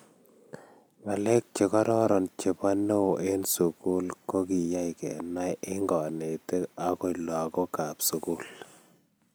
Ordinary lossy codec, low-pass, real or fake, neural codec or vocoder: none; none; real; none